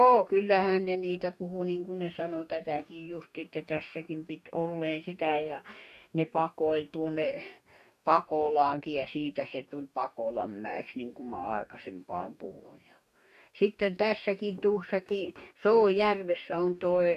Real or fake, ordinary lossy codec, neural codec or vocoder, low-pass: fake; none; codec, 44.1 kHz, 2.6 kbps, DAC; 14.4 kHz